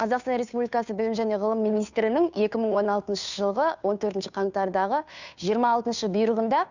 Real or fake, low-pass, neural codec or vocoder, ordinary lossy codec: fake; 7.2 kHz; codec, 16 kHz, 2 kbps, FunCodec, trained on Chinese and English, 25 frames a second; none